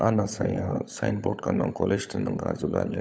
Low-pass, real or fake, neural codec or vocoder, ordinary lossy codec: none; fake; codec, 16 kHz, 16 kbps, FunCodec, trained on LibriTTS, 50 frames a second; none